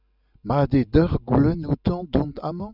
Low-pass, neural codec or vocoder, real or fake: 5.4 kHz; none; real